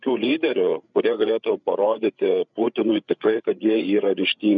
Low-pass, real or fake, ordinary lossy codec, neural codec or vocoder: 7.2 kHz; fake; MP3, 96 kbps; codec, 16 kHz, 8 kbps, FreqCodec, larger model